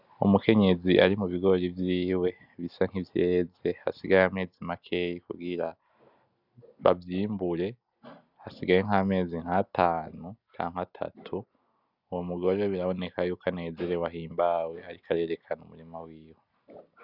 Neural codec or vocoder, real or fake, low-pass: none; real; 5.4 kHz